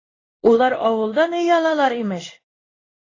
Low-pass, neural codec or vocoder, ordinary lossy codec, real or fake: 7.2 kHz; codec, 16 kHz in and 24 kHz out, 1 kbps, XY-Tokenizer; AAC, 32 kbps; fake